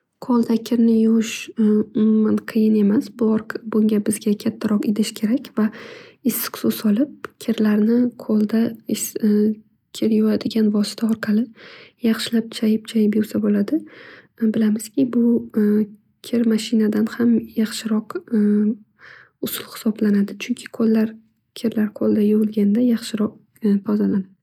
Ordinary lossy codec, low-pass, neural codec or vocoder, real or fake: none; 19.8 kHz; none; real